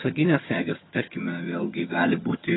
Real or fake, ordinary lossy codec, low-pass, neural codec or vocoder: fake; AAC, 16 kbps; 7.2 kHz; vocoder, 22.05 kHz, 80 mel bands, HiFi-GAN